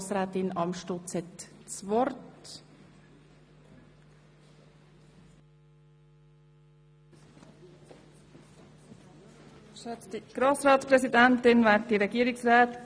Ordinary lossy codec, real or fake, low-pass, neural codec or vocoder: none; real; none; none